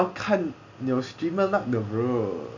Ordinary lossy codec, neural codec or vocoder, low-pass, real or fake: MP3, 64 kbps; none; 7.2 kHz; real